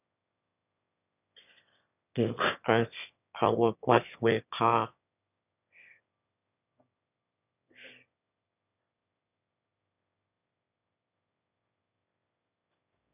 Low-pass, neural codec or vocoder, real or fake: 3.6 kHz; autoencoder, 22.05 kHz, a latent of 192 numbers a frame, VITS, trained on one speaker; fake